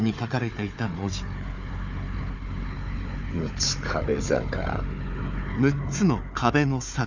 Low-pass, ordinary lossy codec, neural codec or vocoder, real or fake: 7.2 kHz; none; codec, 16 kHz, 4 kbps, FunCodec, trained on Chinese and English, 50 frames a second; fake